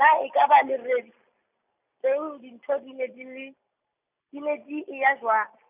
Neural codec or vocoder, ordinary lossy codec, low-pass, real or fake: none; none; 3.6 kHz; real